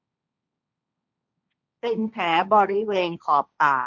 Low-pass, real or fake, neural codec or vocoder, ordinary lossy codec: 7.2 kHz; fake; codec, 16 kHz, 1.1 kbps, Voila-Tokenizer; none